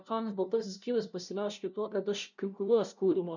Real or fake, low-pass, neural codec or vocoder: fake; 7.2 kHz; codec, 16 kHz, 0.5 kbps, FunCodec, trained on LibriTTS, 25 frames a second